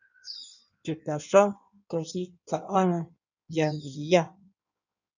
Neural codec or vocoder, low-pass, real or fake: codec, 16 kHz in and 24 kHz out, 1.1 kbps, FireRedTTS-2 codec; 7.2 kHz; fake